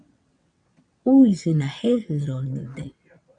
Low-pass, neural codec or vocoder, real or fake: 9.9 kHz; vocoder, 22.05 kHz, 80 mel bands, WaveNeXt; fake